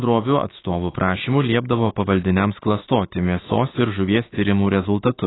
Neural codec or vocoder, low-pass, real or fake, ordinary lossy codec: autoencoder, 48 kHz, 128 numbers a frame, DAC-VAE, trained on Japanese speech; 7.2 kHz; fake; AAC, 16 kbps